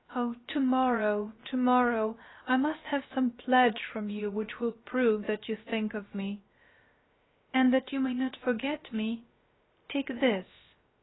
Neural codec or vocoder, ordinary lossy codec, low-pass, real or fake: codec, 16 kHz, about 1 kbps, DyCAST, with the encoder's durations; AAC, 16 kbps; 7.2 kHz; fake